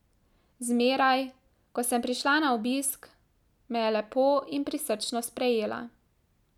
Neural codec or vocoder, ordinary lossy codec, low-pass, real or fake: none; none; 19.8 kHz; real